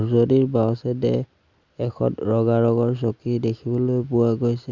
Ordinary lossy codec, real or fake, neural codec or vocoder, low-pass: Opus, 64 kbps; real; none; 7.2 kHz